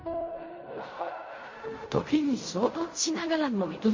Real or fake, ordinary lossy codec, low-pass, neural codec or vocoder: fake; MP3, 32 kbps; 7.2 kHz; codec, 16 kHz in and 24 kHz out, 0.4 kbps, LongCat-Audio-Codec, fine tuned four codebook decoder